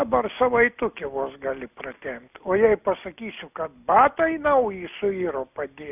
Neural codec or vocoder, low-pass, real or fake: none; 3.6 kHz; real